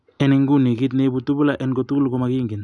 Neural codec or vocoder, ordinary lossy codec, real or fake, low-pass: none; none; real; 10.8 kHz